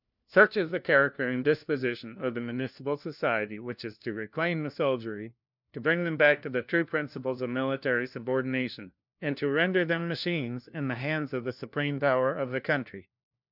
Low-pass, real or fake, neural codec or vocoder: 5.4 kHz; fake; codec, 16 kHz, 1 kbps, FunCodec, trained on LibriTTS, 50 frames a second